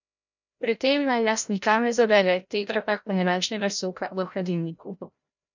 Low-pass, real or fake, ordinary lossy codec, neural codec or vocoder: 7.2 kHz; fake; none; codec, 16 kHz, 0.5 kbps, FreqCodec, larger model